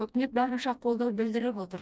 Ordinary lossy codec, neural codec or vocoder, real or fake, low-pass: none; codec, 16 kHz, 1 kbps, FreqCodec, smaller model; fake; none